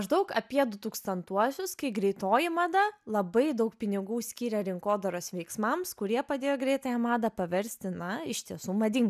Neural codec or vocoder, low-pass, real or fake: none; 14.4 kHz; real